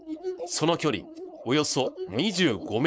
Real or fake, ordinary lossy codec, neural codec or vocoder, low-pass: fake; none; codec, 16 kHz, 4.8 kbps, FACodec; none